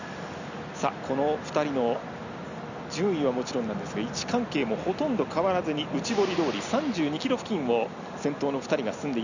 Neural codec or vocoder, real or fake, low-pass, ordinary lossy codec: none; real; 7.2 kHz; none